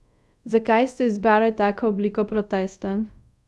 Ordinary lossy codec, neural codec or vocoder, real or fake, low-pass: none; codec, 24 kHz, 0.5 kbps, DualCodec; fake; none